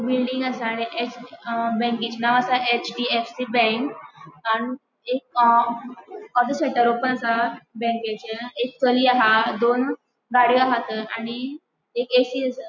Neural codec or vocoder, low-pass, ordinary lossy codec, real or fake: none; 7.2 kHz; none; real